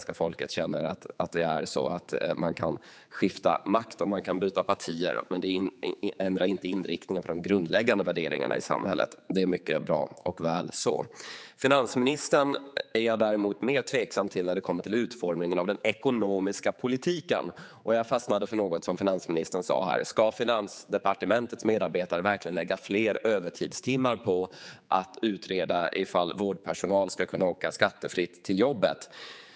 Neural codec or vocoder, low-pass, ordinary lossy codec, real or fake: codec, 16 kHz, 4 kbps, X-Codec, HuBERT features, trained on general audio; none; none; fake